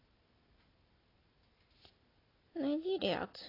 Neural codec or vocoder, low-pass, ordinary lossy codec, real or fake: none; 5.4 kHz; AAC, 24 kbps; real